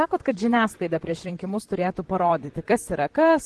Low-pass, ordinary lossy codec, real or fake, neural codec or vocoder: 10.8 kHz; Opus, 16 kbps; fake; vocoder, 44.1 kHz, 128 mel bands, Pupu-Vocoder